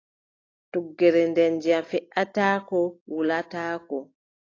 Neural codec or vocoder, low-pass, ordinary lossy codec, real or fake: none; 7.2 kHz; AAC, 32 kbps; real